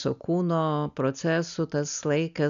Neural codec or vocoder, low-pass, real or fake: none; 7.2 kHz; real